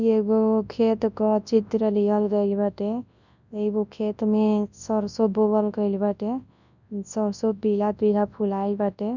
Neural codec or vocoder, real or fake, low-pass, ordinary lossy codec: codec, 24 kHz, 0.9 kbps, WavTokenizer, large speech release; fake; 7.2 kHz; none